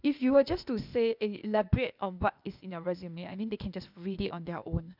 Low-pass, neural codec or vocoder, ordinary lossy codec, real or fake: 5.4 kHz; codec, 16 kHz, 0.8 kbps, ZipCodec; none; fake